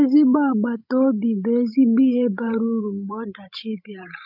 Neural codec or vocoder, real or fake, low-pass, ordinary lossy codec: codec, 16 kHz, 16 kbps, FreqCodec, larger model; fake; 5.4 kHz; MP3, 48 kbps